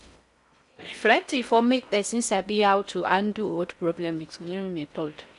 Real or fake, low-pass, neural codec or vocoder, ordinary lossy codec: fake; 10.8 kHz; codec, 16 kHz in and 24 kHz out, 0.6 kbps, FocalCodec, streaming, 4096 codes; none